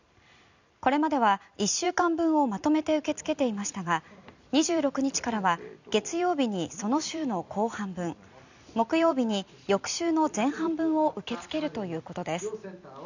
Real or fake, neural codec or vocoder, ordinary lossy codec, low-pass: fake; vocoder, 44.1 kHz, 128 mel bands every 256 samples, BigVGAN v2; none; 7.2 kHz